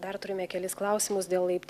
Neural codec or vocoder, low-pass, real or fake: none; 14.4 kHz; real